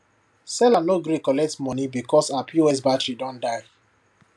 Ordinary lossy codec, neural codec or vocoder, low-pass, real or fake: none; none; none; real